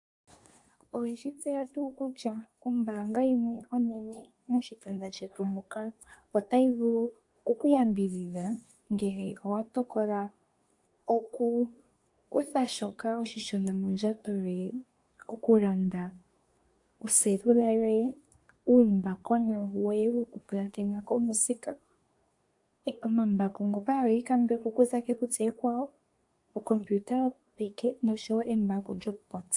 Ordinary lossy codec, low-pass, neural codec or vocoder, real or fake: AAC, 64 kbps; 10.8 kHz; codec, 24 kHz, 1 kbps, SNAC; fake